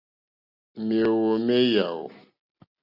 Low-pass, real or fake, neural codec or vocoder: 5.4 kHz; real; none